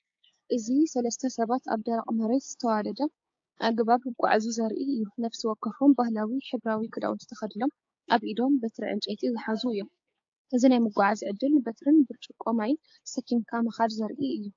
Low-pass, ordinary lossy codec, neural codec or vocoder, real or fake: 7.2 kHz; AAC, 48 kbps; codec, 16 kHz, 6 kbps, DAC; fake